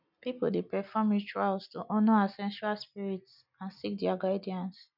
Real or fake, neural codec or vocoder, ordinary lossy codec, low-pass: real; none; none; 5.4 kHz